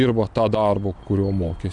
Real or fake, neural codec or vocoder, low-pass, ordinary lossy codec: real; none; 9.9 kHz; AAC, 64 kbps